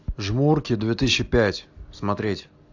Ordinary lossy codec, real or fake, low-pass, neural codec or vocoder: AAC, 48 kbps; real; 7.2 kHz; none